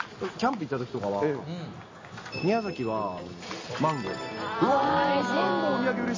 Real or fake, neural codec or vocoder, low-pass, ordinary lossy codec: real; none; 7.2 kHz; MP3, 32 kbps